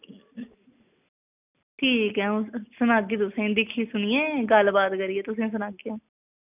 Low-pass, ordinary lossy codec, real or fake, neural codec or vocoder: 3.6 kHz; none; real; none